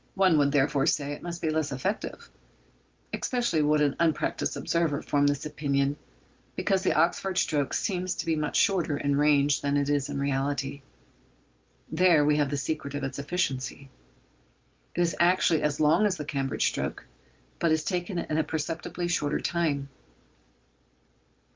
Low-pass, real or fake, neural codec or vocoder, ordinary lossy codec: 7.2 kHz; real; none; Opus, 32 kbps